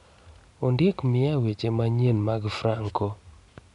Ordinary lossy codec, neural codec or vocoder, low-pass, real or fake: none; none; 10.8 kHz; real